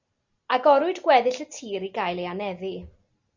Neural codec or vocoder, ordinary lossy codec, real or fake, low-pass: none; Opus, 64 kbps; real; 7.2 kHz